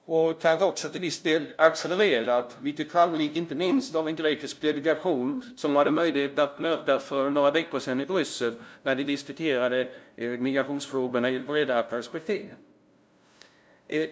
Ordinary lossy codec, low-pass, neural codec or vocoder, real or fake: none; none; codec, 16 kHz, 0.5 kbps, FunCodec, trained on LibriTTS, 25 frames a second; fake